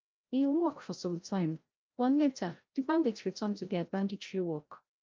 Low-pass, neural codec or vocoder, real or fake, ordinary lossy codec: 7.2 kHz; codec, 16 kHz, 0.5 kbps, FreqCodec, larger model; fake; Opus, 24 kbps